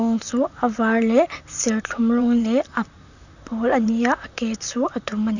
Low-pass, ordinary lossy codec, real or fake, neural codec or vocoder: 7.2 kHz; none; fake; vocoder, 44.1 kHz, 128 mel bands, Pupu-Vocoder